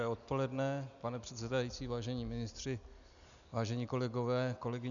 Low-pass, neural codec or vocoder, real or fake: 7.2 kHz; none; real